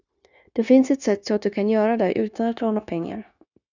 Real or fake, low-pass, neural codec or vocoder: fake; 7.2 kHz; codec, 16 kHz, 0.9 kbps, LongCat-Audio-Codec